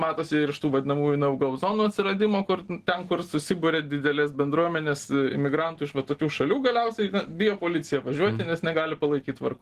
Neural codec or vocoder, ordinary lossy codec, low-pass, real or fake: none; Opus, 16 kbps; 14.4 kHz; real